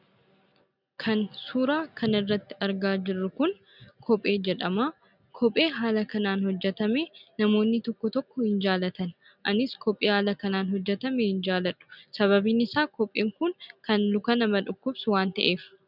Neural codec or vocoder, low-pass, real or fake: none; 5.4 kHz; real